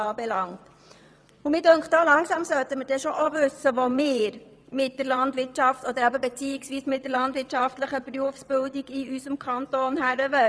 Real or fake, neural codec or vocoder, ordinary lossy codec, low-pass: fake; vocoder, 22.05 kHz, 80 mel bands, WaveNeXt; none; none